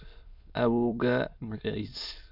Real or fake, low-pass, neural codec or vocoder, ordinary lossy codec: fake; 5.4 kHz; autoencoder, 22.05 kHz, a latent of 192 numbers a frame, VITS, trained on many speakers; none